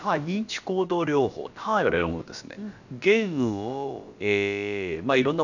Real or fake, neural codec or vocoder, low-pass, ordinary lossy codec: fake; codec, 16 kHz, about 1 kbps, DyCAST, with the encoder's durations; 7.2 kHz; none